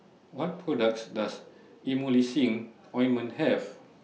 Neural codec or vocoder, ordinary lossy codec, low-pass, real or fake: none; none; none; real